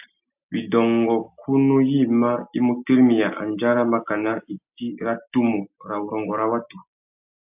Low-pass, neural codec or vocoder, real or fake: 3.6 kHz; none; real